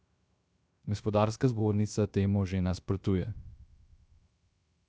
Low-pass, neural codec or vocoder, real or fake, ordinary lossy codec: none; codec, 16 kHz, 0.3 kbps, FocalCodec; fake; none